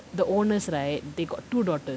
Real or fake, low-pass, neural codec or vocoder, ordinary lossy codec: real; none; none; none